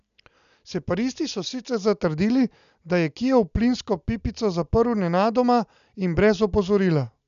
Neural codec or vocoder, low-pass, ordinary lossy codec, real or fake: none; 7.2 kHz; none; real